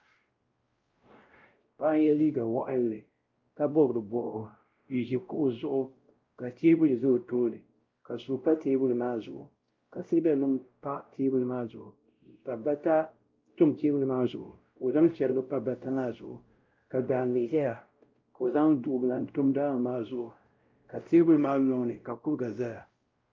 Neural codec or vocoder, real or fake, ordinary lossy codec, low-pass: codec, 16 kHz, 0.5 kbps, X-Codec, WavLM features, trained on Multilingual LibriSpeech; fake; Opus, 32 kbps; 7.2 kHz